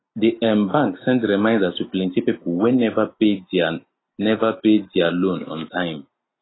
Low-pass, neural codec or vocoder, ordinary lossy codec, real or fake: 7.2 kHz; none; AAC, 16 kbps; real